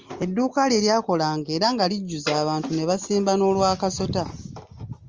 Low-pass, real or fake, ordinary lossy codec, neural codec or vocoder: 7.2 kHz; real; Opus, 32 kbps; none